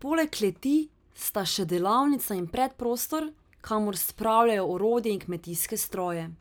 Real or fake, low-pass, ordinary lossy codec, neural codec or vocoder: real; none; none; none